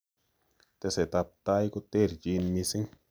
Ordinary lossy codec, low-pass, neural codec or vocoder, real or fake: none; none; none; real